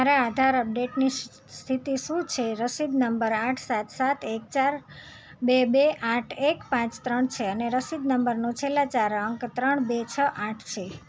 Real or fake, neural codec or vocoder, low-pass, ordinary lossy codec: real; none; none; none